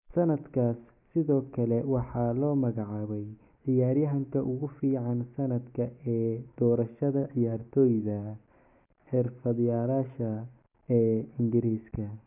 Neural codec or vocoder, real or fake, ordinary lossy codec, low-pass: none; real; none; 3.6 kHz